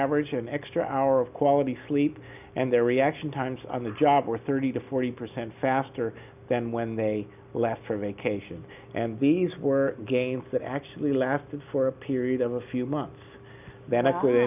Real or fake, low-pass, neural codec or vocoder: real; 3.6 kHz; none